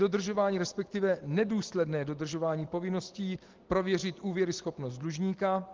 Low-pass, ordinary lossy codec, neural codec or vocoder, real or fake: 7.2 kHz; Opus, 16 kbps; none; real